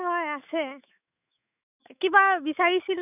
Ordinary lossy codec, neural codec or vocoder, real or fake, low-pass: none; autoencoder, 48 kHz, 128 numbers a frame, DAC-VAE, trained on Japanese speech; fake; 3.6 kHz